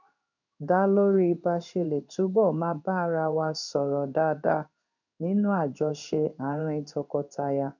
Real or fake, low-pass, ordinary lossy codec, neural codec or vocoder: fake; 7.2 kHz; none; codec, 16 kHz in and 24 kHz out, 1 kbps, XY-Tokenizer